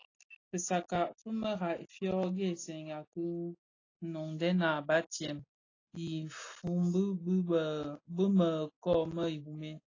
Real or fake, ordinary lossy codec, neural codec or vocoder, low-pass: real; AAC, 32 kbps; none; 7.2 kHz